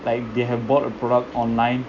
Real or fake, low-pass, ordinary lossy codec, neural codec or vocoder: real; 7.2 kHz; none; none